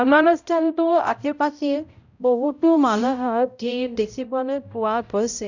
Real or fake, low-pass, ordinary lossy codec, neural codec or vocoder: fake; 7.2 kHz; none; codec, 16 kHz, 0.5 kbps, X-Codec, HuBERT features, trained on balanced general audio